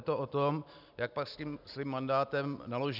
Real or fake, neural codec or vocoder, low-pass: real; none; 5.4 kHz